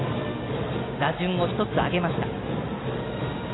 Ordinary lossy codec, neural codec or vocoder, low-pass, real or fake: AAC, 16 kbps; none; 7.2 kHz; real